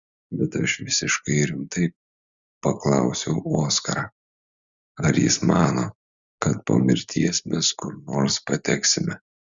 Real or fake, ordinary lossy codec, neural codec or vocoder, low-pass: real; Opus, 64 kbps; none; 7.2 kHz